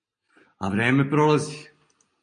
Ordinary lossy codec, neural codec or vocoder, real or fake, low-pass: MP3, 48 kbps; none; real; 9.9 kHz